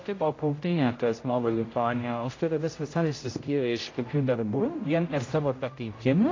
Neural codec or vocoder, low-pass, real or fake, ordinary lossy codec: codec, 16 kHz, 0.5 kbps, X-Codec, HuBERT features, trained on general audio; 7.2 kHz; fake; AAC, 32 kbps